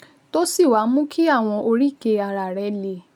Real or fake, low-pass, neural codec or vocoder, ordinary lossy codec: real; 19.8 kHz; none; none